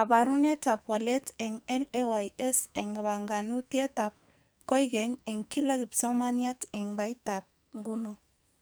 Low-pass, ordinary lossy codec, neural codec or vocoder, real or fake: none; none; codec, 44.1 kHz, 2.6 kbps, SNAC; fake